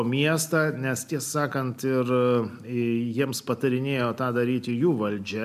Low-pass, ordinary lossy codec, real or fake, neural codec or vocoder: 14.4 kHz; AAC, 96 kbps; real; none